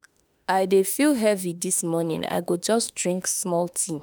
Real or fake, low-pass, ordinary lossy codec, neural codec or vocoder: fake; none; none; autoencoder, 48 kHz, 32 numbers a frame, DAC-VAE, trained on Japanese speech